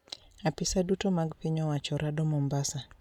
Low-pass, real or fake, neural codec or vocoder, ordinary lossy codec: 19.8 kHz; real; none; none